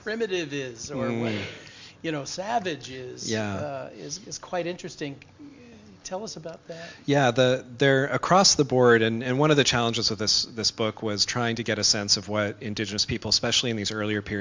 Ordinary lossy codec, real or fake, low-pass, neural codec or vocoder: MP3, 64 kbps; real; 7.2 kHz; none